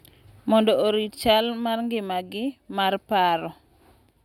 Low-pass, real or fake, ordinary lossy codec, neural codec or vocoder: 19.8 kHz; real; none; none